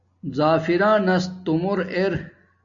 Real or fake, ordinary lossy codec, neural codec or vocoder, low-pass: real; AAC, 64 kbps; none; 7.2 kHz